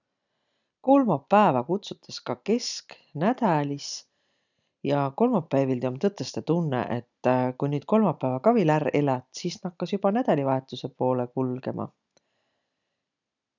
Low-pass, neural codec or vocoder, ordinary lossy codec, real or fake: 7.2 kHz; none; none; real